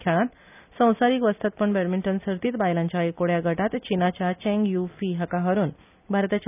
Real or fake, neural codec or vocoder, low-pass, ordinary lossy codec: real; none; 3.6 kHz; none